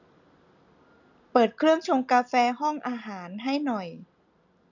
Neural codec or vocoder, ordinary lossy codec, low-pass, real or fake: none; none; 7.2 kHz; real